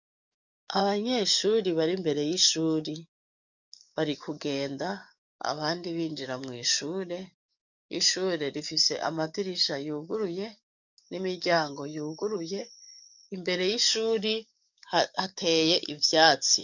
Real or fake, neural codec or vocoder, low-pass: fake; codec, 44.1 kHz, 7.8 kbps, DAC; 7.2 kHz